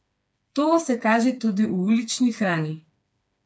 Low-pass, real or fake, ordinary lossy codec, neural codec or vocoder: none; fake; none; codec, 16 kHz, 4 kbps, FreqCodec, smaller model